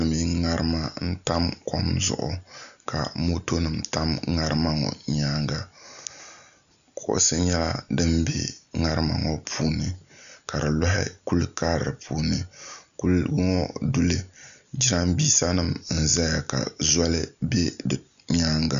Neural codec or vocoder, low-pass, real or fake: none; 7.2 kHz; real